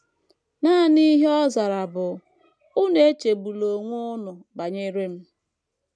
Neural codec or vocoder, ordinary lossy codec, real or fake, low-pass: none; none; real; none